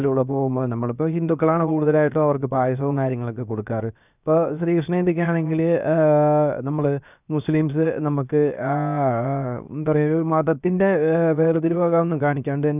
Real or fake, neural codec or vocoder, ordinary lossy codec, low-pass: fake; codec, 16 kHz, 0.7 kbps, FocalCodec; none; 3.6 kHz